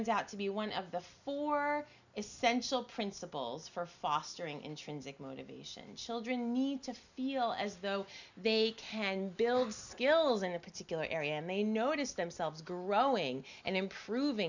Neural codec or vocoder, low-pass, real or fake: none; 7.2 kHz; real